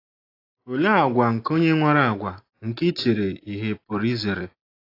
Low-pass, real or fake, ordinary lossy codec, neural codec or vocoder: 5.4 kHz; real; AAC, 32 kbps; none